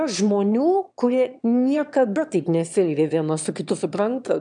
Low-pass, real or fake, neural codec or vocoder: 9.9 kHz; fake; autoencoder, 22.05 kHz, a latent of 192 numbers a frame, VITS, trained on one speaker